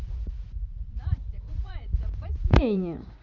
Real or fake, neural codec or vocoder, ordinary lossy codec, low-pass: fake; vocoder, 44.1 kHz, 80 mel bands, Vocos; none; 7.2 kHz